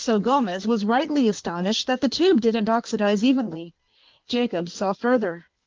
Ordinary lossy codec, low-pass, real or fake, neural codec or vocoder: Opus, 32 kbps; 7.2 kHz; fake; codec, 16 kHz in and 24 kHz out, 1.1 kbps, FireRedTTS-2 codec